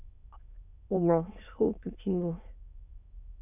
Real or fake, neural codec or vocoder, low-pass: fake; autoencoder, 22.05 kHz, a latent of 192 numbers a frame, VITS, trained on many speakers; 3.6 kHz